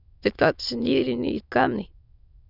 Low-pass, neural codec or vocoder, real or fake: 5.4 kHz; autoencoder, 22.05 kHz, a latent of 192 numbers a frame, VITS, trained on many speakers; fake